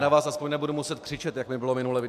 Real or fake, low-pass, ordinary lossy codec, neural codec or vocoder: real; 14.4 kHz; MP3, 96 kbps; none